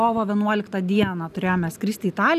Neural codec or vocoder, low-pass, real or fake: none; 14.4 kHz; real